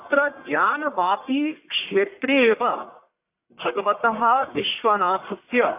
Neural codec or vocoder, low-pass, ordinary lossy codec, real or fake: codec, 44.1 kHz, 1.7 kbps, Pupu-Codec; 3.6 kHz; AAC, 32 kbps; fake